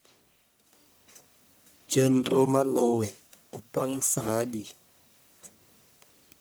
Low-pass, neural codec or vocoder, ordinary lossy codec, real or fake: none; codec, 44.1 kHz, 1.7 kbps, Pupu-Codec; none; fake